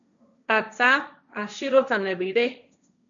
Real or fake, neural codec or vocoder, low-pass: fake; codec, 16 kHz, 1.1 kbps, Voila-Tokenizer; 7.2 kHz